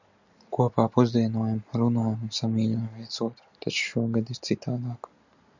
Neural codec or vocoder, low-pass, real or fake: none; 7.2 kHz; real